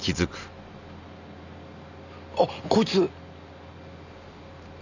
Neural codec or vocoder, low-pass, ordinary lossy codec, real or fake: none; 7.2 kHz; none; real